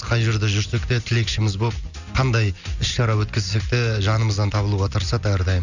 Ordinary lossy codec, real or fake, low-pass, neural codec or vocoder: none; real; 7.2 kHz; none